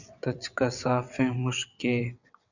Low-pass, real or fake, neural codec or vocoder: 7.2 kHz; fake; vocoder, 22.05 kHz, 80 mel bands, WaveNeXt